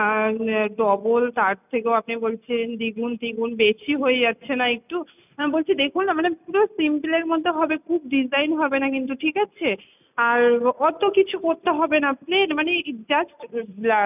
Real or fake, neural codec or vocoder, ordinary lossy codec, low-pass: real; none; none; 3.6 kHz